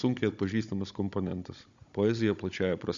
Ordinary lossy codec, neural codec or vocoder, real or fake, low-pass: Opus, 64 kbps; codec, 16 kHz, 16 kbps, FunCodec, trained on Chinese and English, 50 frames a second; fake; 7.2 kHz